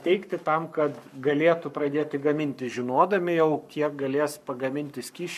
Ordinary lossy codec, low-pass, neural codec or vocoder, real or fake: MP3, 96 kbps; 14.4 kHz; codec, 44.1 kHz, 7.8 kbps, Pupu-Codec; fake